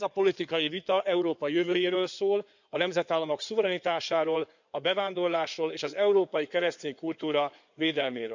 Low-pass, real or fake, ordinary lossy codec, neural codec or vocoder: 7.2 kHz; fake; none; codec, 16 kHz in and 24 kHz out, 2.2 kbps, FireRedTTS-2 codec